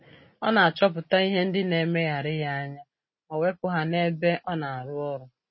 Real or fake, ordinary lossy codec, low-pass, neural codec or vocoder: real; MP3, 24 kbps; 7.2 kHz; none